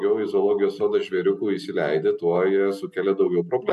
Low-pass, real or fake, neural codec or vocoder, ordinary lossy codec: 14.4 kHz; real; none; MP3, 64 kbps